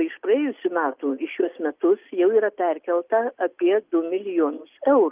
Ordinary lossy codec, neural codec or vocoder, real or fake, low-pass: Opus, 32 kbps; none; real; 3.6 kHz